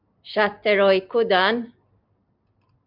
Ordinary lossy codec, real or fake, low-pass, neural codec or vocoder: MP3, 48 kbps; real; 5.4 kHz; none